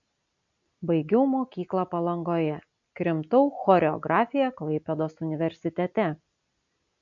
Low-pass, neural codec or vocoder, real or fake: 7.2 kHz; none; real